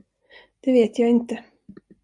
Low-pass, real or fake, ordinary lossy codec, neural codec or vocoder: 10.8 kHz; real; MP3, 96 kbps; none